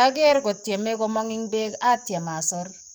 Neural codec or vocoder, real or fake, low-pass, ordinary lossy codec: codec, 44.1 kHz, 7.8 kbps, DAC; fake; none; none